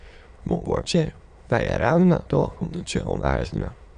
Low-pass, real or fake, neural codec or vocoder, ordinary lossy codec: 9.9 kHz; fake; autoencoder, 22.05 kHz, a latent of 192 numbers a frame, VITS, trained on many speakers; Opus, 64 kbps